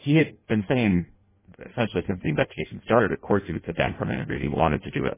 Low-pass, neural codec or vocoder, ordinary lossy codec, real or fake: 3.6 kHz; codec, 16 kHz in and 24 kHz out, 0.6 kbps, FireRedTTS-2 codec; MP3, 16 kbps; fake